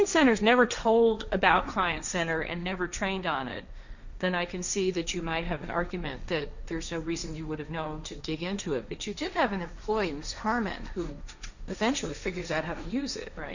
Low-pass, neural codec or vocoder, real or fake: 7.2 kHz; codec, 16 kHz, 1.1 kbps, Voila-Tokenizer; fake